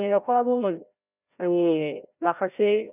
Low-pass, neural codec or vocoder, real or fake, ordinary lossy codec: 3.6 kHz; codec, 16 kHz, 0.5 kbps, FreqCodec, larger model; fake; none